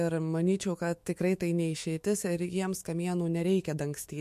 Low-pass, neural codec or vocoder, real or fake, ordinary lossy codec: 14.4 kHz; autoencoder, 48 kHz, 128 numbers a frame, DAC-VAE, trained on Japanese speech; fake; MP3, 64 kbps